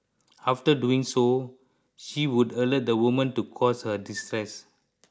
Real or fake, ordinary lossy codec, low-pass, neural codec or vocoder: real; none; none; none